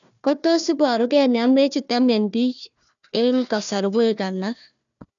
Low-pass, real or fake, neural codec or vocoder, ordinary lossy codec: 7.2 kHz; fake; codec, 16 kHz, 1 kbps, FunCodec, trained on Chinese and English, 50 frames a second; none